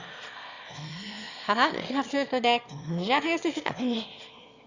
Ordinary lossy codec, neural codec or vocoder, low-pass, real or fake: Opus, 64 kbps; autoencoder, 22.05 kHz, a latent of 192 numbers a frame, VITS, trained on one speaker; 7.2 kHz; fake